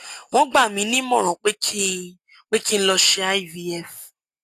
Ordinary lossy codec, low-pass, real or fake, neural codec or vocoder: AAC, 64 kbps; 14.4 kHz; real; none